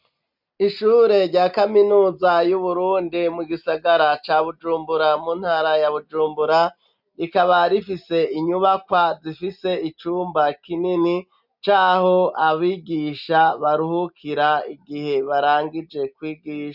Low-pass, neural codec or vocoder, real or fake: 5.4 kHz; none; real